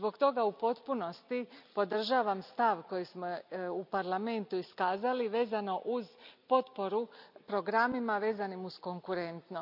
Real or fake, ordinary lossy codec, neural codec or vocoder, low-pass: real; none; none; 5.4 kHz